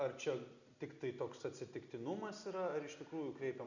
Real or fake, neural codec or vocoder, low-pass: real; none; 7.2 kHz